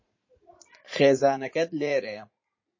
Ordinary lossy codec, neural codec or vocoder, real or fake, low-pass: MP3, 32 kbps; vocoder, 44.1 kHz, 80 mel bands, Vocos; fake; 7.2 kHz